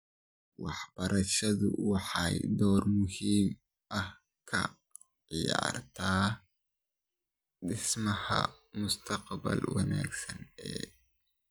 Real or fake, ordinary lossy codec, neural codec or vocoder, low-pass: real; none; none; none